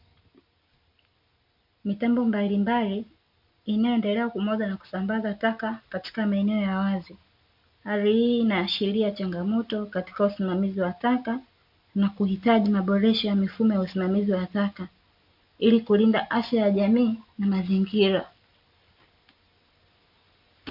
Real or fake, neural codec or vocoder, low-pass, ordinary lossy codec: real; none; 5.4 kHz; MP3, 48 kbps